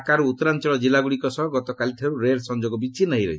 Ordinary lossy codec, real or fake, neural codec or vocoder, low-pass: none; real; none; none